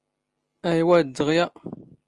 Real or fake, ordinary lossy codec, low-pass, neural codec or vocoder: real; Opus, 32 kbps; 10.8 kHz; none